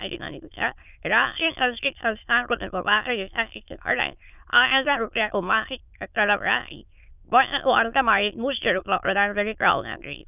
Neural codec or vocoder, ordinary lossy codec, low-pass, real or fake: autoencoder, 22.05 kHz, a latent of 192 numbers a frame, VITS, trained on many speakers; none; 3.6 kHz; fake